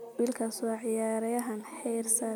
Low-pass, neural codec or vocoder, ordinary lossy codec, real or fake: none; none; none; real